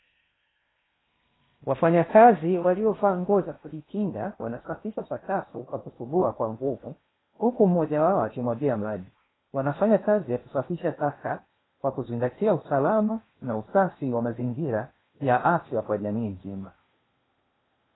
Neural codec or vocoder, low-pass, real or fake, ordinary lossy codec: codec, 16 kHz in and 24 kHz out, 0.8 kbps, FocalCodec, streaming, 65536 codes; 7.2 kHz; fake; AAC, 16 kbps